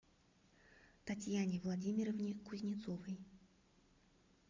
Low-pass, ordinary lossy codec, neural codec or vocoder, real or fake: 7.2 kHz; MP3, 64 kbps; none; real